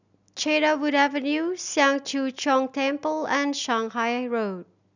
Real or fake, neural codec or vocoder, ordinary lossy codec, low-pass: real; none; none; 7.2 kHz